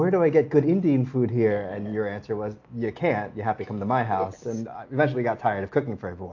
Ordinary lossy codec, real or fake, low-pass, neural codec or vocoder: AAC, 48 kbps; real; 7.2 kHz; none